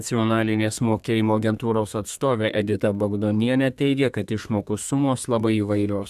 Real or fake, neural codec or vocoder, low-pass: fake; codec, 32 kHz, 1.9 kbps, SNAC; 14.4 kHz